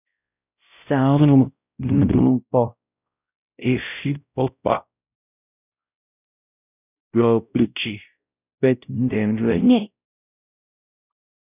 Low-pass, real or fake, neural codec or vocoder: 3.6 kHz; fake; codec, 16 kHz, 0.5 kbps, X-Codec, WavLM features, trained on Multilingual LibriSpeech